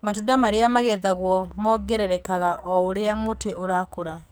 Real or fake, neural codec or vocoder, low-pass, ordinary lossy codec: fake; codec, 44.1 kHz, 2.6 kbps, SNAC; none; none